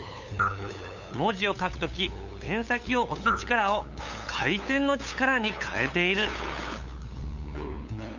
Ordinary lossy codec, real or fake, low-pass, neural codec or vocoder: none; fake; 7.2 kHz; codec, 16 kHz, 8 kbps, FunCodec, trained on LibriTTS, 25 frames a second